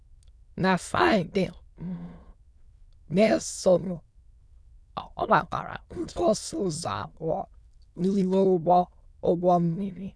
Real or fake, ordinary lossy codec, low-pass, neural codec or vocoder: fake; none; none; autoencoder, 22.05 kHz, a latent of 192 numbers a frame, VITS, trained on many speakers